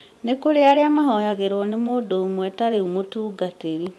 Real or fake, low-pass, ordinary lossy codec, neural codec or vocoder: fake; none; none; vocoder, 24 kHz, 100 mel bands, Vocos